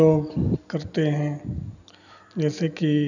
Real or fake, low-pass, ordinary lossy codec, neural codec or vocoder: real; 7.2 kHz; none; none